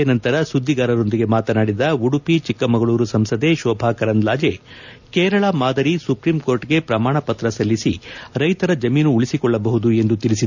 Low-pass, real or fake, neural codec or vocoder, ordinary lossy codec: 7.2 kHz; real; none; none